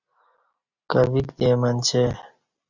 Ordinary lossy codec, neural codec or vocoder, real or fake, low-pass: Opus, 64 kbps; none; real; 7.2 kHz